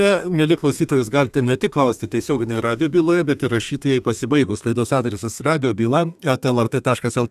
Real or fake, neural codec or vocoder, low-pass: fake; codec, 32 kHz, 1.9 kbps, SNAC; 14.4 kHz